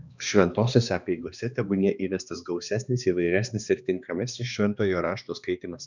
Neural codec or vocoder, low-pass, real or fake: codec, 16 kHz, 2 kbps, X-Codec, HuBERT features, trained on balanced general audio; 7.2 kHz; fake